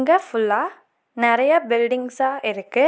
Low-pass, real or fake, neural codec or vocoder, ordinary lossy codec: none; real; none; none